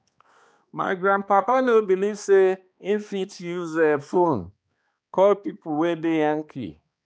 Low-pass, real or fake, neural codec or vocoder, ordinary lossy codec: none; fake; codec, 16 kHz, 2 kbps, X-Codec, HuBERT features, trained on balanced general audio; none